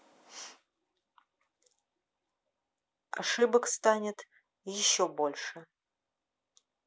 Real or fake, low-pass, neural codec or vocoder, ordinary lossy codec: real; none; none; none